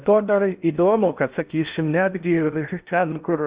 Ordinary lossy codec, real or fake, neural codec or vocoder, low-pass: Opus, 64 kbps; fake; codec, 16 kHz in and 24 kHz out, 0.6 kbps, FocalCodec, streaming, 4096 codes; 3.6 kHz